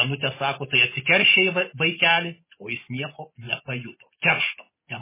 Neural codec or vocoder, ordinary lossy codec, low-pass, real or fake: none; MP3, 16 kbps; 3.6 kHz; real